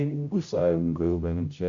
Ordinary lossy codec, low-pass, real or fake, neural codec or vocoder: AAC, 48 kbps; 7.2 kHz; fake; codec, 16 kHz, 0.5 kbps, X-Codec, HuBERT features, trained on general audio